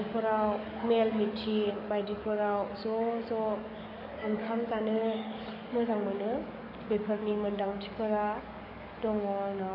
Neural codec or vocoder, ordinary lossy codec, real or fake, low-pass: codec, 44.1 kHz, 7.8 kbps, DAC; none; fake; 5.4 kHz